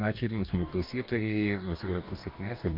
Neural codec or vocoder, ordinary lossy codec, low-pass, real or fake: codec, 44.1 kHz, 2.6 kbps, DAC; MP3, 48 kbps; 5.4 kHz; fake